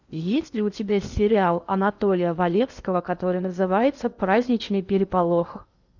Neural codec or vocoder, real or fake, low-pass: codec, 16 kHz in and 24 kHz out, 0.8 kbps, FocalCodec, streaming, 65536 codes; fake; 7.2 kHz